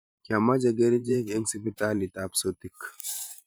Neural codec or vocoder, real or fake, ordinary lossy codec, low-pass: vocoder, 44.1 kHz, 128 mel bands every 512 samples, BigVGAN v2; fake; none; none